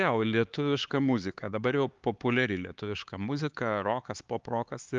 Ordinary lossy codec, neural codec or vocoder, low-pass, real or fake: Opus, 32 kbps; codec, 16 kHz, 4 kbps, X-Codec, HuBERT features, trained on LibriSpeech; 7.2 kHz; fake